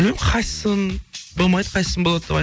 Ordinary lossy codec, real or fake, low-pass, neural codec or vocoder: none; real; none; none